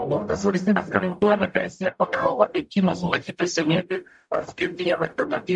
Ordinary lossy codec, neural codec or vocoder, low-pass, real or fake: MP3, 96 kbps; codec, 44.1 kHz, 0.9 kbps, DAC; 10.8 kHz; fake